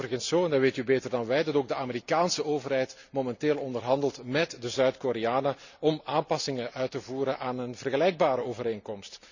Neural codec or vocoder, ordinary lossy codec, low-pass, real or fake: none; none; 7.2 kHz; real